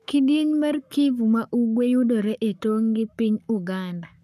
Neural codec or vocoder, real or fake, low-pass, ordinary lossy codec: codec, 44.1 kHz, 7.8 kbps, Pupu-Codec; fake; 14.4 kHz; none